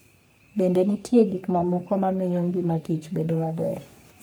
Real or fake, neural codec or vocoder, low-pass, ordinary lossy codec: fake; codec, 44.1 kHz, 3.4 kbps, Pupu-Codec; none; none